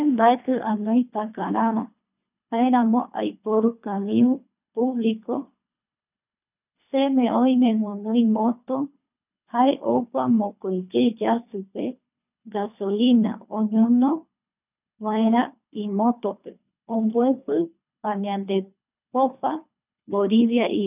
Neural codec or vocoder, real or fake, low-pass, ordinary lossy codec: codec, 24 kHz, 3 kbps, HILCodec; fake; 3.6 kHz; none